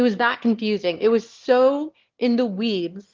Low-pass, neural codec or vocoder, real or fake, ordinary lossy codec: 7.2 kHz; autoencoder, 22.05 kHz, a latent of 192 numbers a frame, VITS, trained on one speaker; fake; Opus, 16 kbps